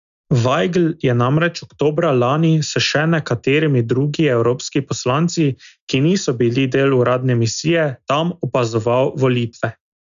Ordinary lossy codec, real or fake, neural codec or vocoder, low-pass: none; real; none; 7.2 kHz